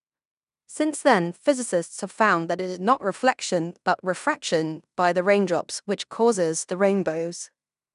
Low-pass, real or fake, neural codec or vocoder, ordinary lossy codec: 10.8 kHz; fake; codec, 16 kHz in and 24 kHz out, 0.9 kbps, LongCat-Audio-Codec, fine tuned four codebook decoder; none